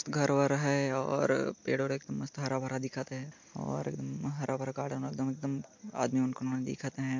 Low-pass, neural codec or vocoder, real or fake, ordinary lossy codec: 7.2 kHz; none; real; MP3, 48 kbps